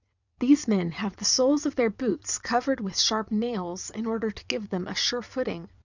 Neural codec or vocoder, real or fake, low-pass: vocoder, 22.05 kHz, 80 mel bands, WaveNeXt; fake; 7.2 kHz